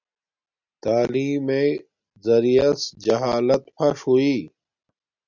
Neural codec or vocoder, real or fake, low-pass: none; real; 7.2 kHz